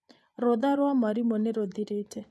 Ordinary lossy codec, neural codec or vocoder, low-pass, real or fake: none; vocoder, 24 kHz, 100 mel bands, Vocos; none; fake